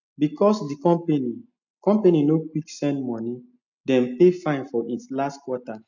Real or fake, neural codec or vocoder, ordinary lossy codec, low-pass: real; none; none; 7.2 kHz